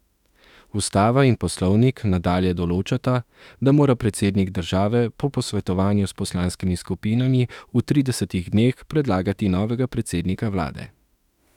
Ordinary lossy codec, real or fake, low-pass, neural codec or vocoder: none; fake; 19.8 kHz; autoencoder, 48 kHz, 32 numbers a frame, DAC-VAE, trained on Japanese speech